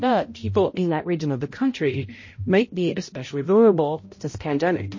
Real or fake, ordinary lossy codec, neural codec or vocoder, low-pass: fake; MP3, 32 kbps; codec, 16 kHz, 0.5 kbps, X-Codec, HuBERT features, trained on balanced general audio; 7.2 kHz